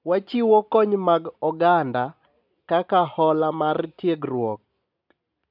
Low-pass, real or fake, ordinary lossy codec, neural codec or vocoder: 5.4 kHz; real; none; none